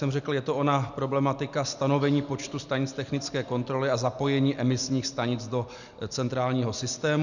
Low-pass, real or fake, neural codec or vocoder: 7.2 kHz; real; none